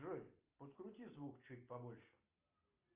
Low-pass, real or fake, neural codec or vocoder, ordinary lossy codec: 3.6 kHz; real; none; Opus, 32 kbps